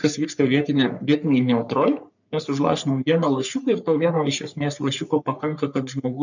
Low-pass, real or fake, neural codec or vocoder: 7.2 kHz; fake; codec, 44.1 kHz, 3.4 kbps, Pupu-Codec